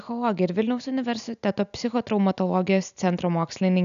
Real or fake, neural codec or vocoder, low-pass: real; none; 7.2 kHz